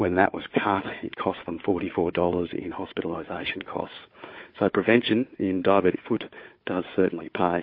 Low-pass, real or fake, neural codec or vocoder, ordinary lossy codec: 5.4 kHz; fake; codec, 16 kHz, 4 kbps, FunCodec, trained on Chinese and English, 50 frames a second; MP3, 32 kbps